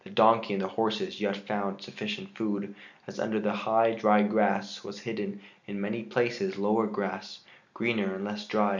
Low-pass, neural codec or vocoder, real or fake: 7.2 kHz; none; real